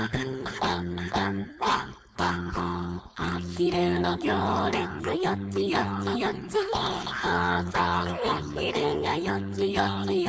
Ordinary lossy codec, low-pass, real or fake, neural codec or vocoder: none; none; fake; codec, 16 kHz, 4.8 kbps, FACodec